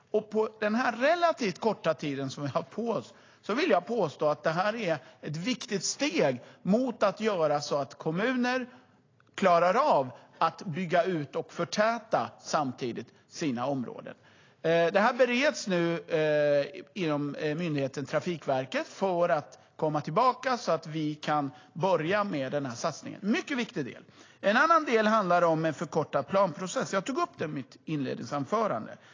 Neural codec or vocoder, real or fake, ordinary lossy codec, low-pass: none; real; AAC, 32 kbps; 7.2 kHz